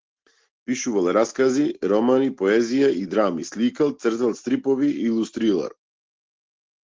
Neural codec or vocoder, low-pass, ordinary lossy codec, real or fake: none; 7.2 kHz; Opus, 16 kbps; real